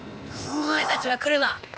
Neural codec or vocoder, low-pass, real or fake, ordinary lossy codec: codec, 16 kHz, 0.8 kbps, ZipCodec; none; fake; none